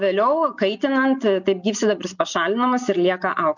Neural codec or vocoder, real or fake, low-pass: none; real; 7.2 kHz